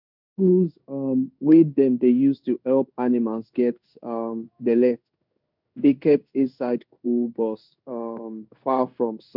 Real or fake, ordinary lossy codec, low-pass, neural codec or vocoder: fake; none; 5.4 kHz; codec, 16 kHz in and 24 kHz out, 1 kbps, XY-Tokenizer